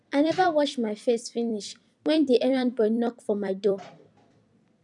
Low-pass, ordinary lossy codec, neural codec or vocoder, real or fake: 10.8 kHz; none; vocoder, 44.1 kHz, 128 mel bands every 256 samples, BigVGAN v2; fake